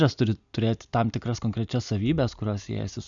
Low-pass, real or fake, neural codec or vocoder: 7.2 kHz; real; none